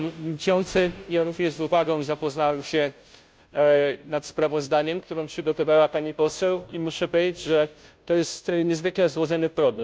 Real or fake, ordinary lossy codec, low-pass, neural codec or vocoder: fake; none; none; codec, 16 kHz, 0.5 kbps, FunCodec, trained on Chinese and English, 25 frames a second